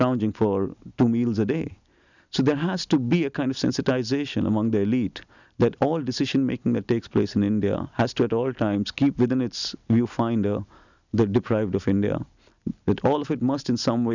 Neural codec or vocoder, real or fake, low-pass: none; real; 7.2 kHz